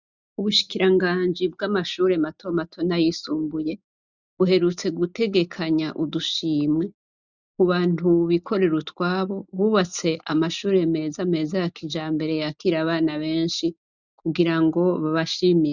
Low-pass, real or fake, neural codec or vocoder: 7.2 kHz; real; none